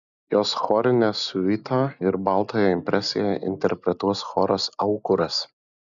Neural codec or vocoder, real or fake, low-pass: none; real; 7.2 kHz